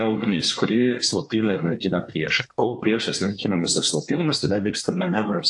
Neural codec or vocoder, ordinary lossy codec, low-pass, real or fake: codec, 24 kHz, 1 kbps, SNAC; AAC, 64 kbps; 10.8 kHz; fake